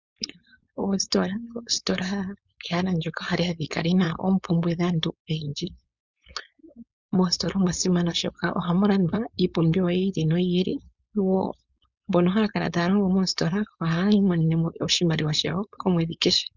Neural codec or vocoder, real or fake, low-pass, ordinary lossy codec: codec, 16 kHz, 4.8 kbps, FACodec; fake; 7.2 kHz; Opus, 64 kbps